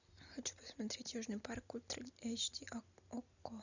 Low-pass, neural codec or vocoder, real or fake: 7.2 kHz; none; real